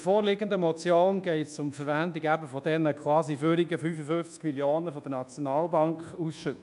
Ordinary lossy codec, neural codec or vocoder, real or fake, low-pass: none; codec, 24 kHz, 1.2 kbps, DualCodec; fake; 10.8 kHz